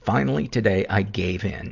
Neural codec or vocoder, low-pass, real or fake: none; 7.2 kHz; real